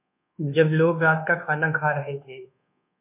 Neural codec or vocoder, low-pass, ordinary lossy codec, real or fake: codec, 24 kHz, 1.2 kbps, DualCodec; 3.6 kHz; MP3, 32 kbps; fake